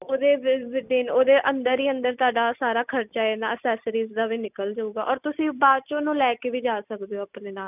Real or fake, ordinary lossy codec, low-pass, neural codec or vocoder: real; none; 3.6 kHz; none